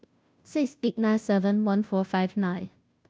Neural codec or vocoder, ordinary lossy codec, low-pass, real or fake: codec, 16 kHz, 0.5 kbps, FunCodec, trained on Chinese and English, 25 frames a second; none; none; fake